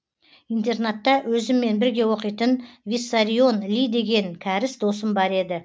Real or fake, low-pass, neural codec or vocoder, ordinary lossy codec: real; none; none; none